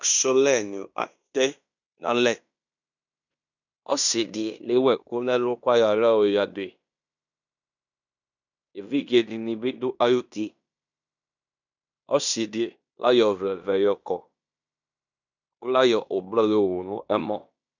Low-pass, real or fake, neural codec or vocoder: 7.2 kHz; fake; codec, 16 kHz in and 24 kHz out, 0.9 kbps, LongCat-Audio-Codec, fine tuned four codebook decoder